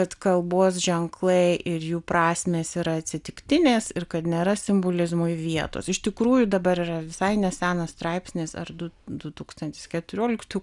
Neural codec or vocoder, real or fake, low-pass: none; real; 10.8 kHz